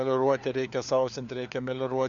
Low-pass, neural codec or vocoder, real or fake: 7.2 kHz; codec, 16 kHz, 4 kbps, FunCodec, trained on LibriTTS, 50 frames a second; fake